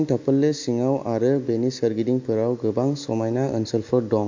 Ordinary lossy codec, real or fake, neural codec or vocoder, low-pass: MP3, 48 kbps; real; none; 7.2 kHz